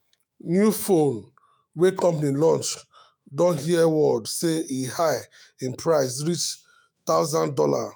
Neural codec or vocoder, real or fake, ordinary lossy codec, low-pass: autoencoder, 48 kHz, 128 numbers a frame, DAC-VAE, trained on Japanese speech; fake; none; none